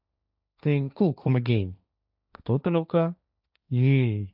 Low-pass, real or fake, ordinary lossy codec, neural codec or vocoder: 5.4 kHz; fake; none; codec, 16 kHz, 1.1 kbps, Voila-Tokenizer